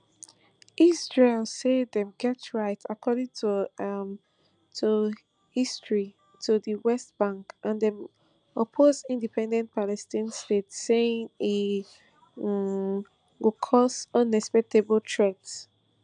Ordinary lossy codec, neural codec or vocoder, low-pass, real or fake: none; none; 9.9 kHz; real